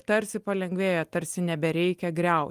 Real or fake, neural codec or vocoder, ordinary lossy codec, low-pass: real; none; Opus, 32 kbps; 14.4 kHz